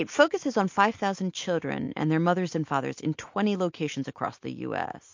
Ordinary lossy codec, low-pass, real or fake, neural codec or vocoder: MP3, 48 kbps; 7.2 kHz; real; none